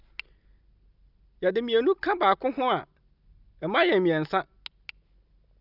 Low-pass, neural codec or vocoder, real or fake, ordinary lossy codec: 5.4 kHz; none; real; none